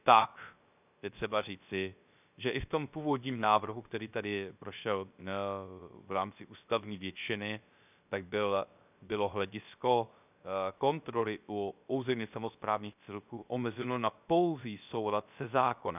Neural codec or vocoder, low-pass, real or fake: codec, 16 kHz, 0.3 kbps, FocalCodec; 3.6 kHz; fake